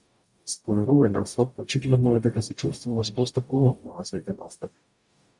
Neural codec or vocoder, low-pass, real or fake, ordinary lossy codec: codec, 44.1 kHz, 0.9 kbps, DAC; 10.8 kHz; fake; MP3, 96 kbps